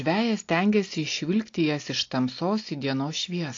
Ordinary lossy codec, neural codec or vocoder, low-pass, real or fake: AAC, 48 kbps; none; 7.2 kHz; real